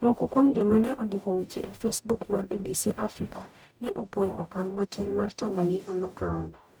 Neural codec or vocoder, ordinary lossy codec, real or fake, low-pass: codec, 44.1 kHz, 0.9 kbps, DAC; none; fake; none